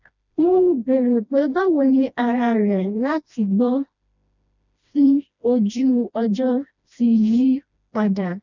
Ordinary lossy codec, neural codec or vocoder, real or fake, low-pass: none; codec, 16 kHz, 1 kbps, FreqCodec, smaller model; fake; 7.2 kHz